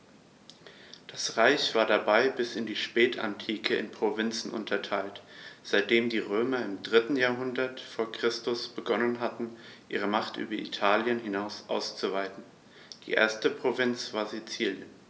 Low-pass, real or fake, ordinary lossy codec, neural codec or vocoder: none; real; none; none